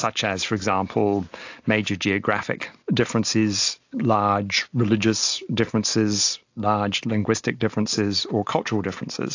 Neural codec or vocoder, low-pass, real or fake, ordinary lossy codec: none; 7.2 kHz; real; AAC, 48 kbps